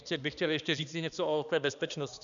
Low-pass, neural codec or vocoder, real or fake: 7.2 kHz; codec, 16 kHz, 2 kbps, X-Codec, HuBERT features, trained on balanced general audio; fake